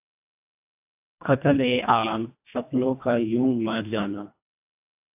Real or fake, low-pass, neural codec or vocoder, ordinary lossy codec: fake; 3.6 kHz; codec, 24 kHz, 1.5 kbps, HILCodec; AAC, 32 kbps